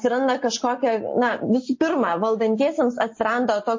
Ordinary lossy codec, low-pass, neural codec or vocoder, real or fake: MP3, 32 kbps; 7.2 kHz; none; real